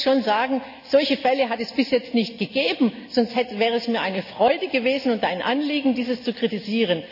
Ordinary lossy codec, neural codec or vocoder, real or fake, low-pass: none; none; real; 5.4 kHz